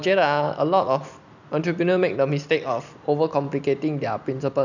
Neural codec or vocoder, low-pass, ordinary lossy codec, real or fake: none; 7.2 kHz; none; real